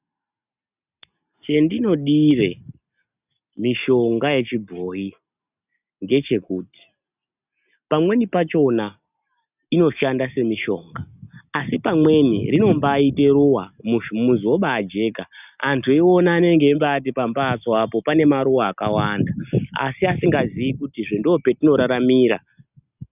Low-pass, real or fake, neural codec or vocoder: 3.6 kHz; real; none